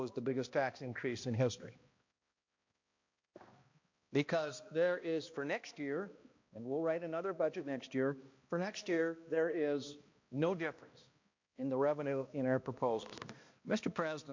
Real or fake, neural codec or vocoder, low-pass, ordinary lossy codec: fake; codec, 16 kHz, 1 kbps, X-Codec, HuBERT features, trained on balanced general audio; 7.2 kHz; MP3, 48 kbps